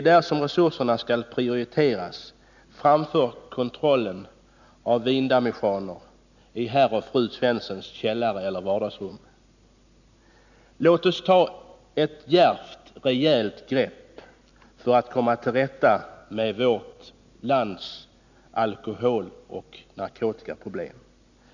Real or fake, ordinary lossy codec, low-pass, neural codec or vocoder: real; none; 7.2 kHz; none